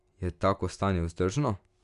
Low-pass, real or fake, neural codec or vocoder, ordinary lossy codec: 10.8 kHz; real; none; none